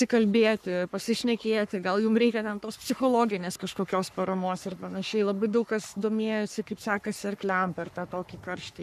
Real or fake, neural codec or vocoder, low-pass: fake; codec, 44.1 kHz, 3.4 kbps, Pupu-Codec; 14.4 kHz